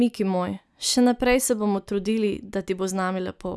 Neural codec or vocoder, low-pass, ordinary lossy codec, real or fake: none; none; none; real